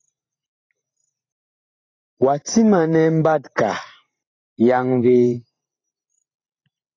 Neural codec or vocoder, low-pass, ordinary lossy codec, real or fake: vocoder, 44.1 kHz, 128 mel bands every 512 samples, BigVGAN v2; 7.2 kHz; AAC, 32 kbps; fake